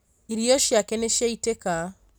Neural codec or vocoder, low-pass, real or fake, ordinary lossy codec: none; none; real; none